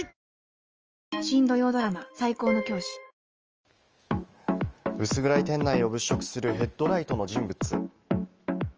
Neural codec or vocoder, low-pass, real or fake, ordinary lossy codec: autoencoder, 48 kHz, 128 numbers a frame, DAC-VAE, trained on Japanese speech; 7.2 kHz; fake; Opus, 24 kbps